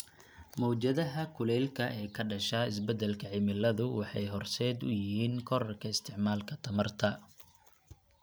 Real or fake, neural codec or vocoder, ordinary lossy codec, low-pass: real; none; none; none